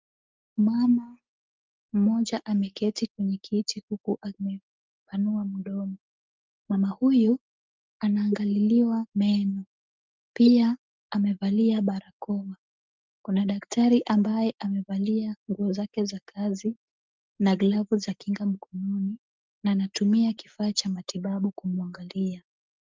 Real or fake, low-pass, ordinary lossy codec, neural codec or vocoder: real; 7.2 kHz; Opus, 24 kbps; none